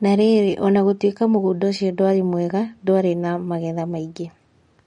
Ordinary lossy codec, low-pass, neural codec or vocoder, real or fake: MP3, 48 kbps; 19.8 kHz; none; real